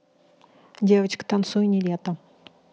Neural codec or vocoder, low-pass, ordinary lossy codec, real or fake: none; none; none; real